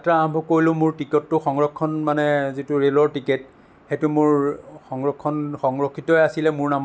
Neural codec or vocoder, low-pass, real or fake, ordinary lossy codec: none; none; real; none